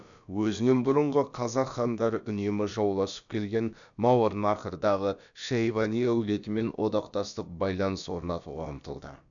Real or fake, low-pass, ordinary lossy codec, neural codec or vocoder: fake; 7.2 kHz; none; codec, 16 kHz, about 1 kbps, DyCAST, with the encoder's durations